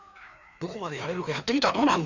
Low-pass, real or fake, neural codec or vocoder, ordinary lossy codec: 7.2 kHz; fake; codec, 16 kHz, 2 kbps, FreqCodec, larger model; none